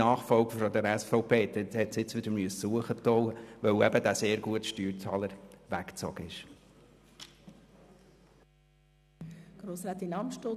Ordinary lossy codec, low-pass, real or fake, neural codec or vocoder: none; 14.4 kHz; real; none